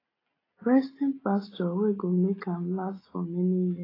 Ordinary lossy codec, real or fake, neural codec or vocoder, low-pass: AAC, 24 kbps; real; none; 5.4 kHz